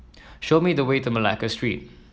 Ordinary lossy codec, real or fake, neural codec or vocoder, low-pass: none; real; none; none